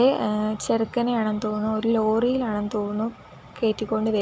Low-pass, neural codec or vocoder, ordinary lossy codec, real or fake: none; none; none; real